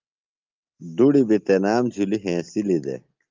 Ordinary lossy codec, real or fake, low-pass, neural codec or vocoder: Opus, 24 kbps; real; 7.2 kHz; none